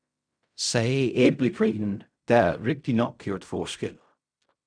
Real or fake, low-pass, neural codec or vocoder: fake; 9.9 kHz; codec, 16 kHz in and 24 kHz out, 0.4 kbps, LongCat-Audio-Codec, fine tuned four codebook decoder